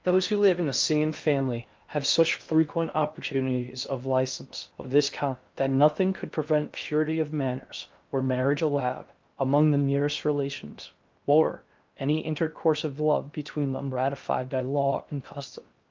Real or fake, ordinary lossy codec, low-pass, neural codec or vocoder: fake; Opus, 24 kbps; 7.2 kHz; codec, 16 kHz in and 24 kHz out, 0.6 kbps, FocalCodec, streaming, 4096 codes